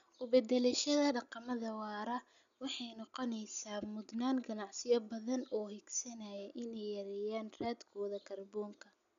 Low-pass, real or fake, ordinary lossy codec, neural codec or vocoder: 7.2 kHz; real; none; none